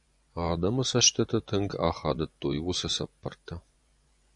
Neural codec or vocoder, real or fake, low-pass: vocoder, 24 kHz, 100 mel bands, Vocos; fake; 10.8 kHz